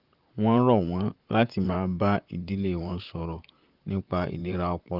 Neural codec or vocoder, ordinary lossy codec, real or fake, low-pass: vocoder, 44.1 kHz, 128 mel bands, Pupu-Vocoder; Opus, 32 kbps; fake; 5.4 kHz